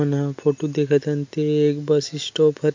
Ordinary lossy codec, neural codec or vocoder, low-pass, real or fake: MP3, 48 kbps; none; 7.2 kHz; real